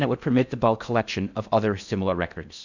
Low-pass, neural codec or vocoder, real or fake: 7.2 kHz; codec, 16 kHz in and 24 kHz out, 0.6 kbps, FocalCodec, streaming, 4096 codes; fake